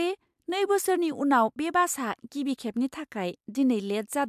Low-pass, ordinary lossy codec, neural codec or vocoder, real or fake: 14.4 kHz; MP3, 96 kbps; none; real